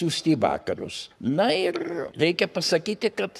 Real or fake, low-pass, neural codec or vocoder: fake; 14.4 kHz; codec, 44.1 kHz, 7.8 kbps, Pupu-Codec